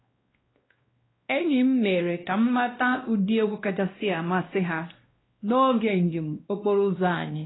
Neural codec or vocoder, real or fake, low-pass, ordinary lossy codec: codec, 16 kHz, 1 kbps, X-Codec, WavLM features, trained on Multilingual LibriSpeech; fake; 7.2 kHz; AAC, 16 kbps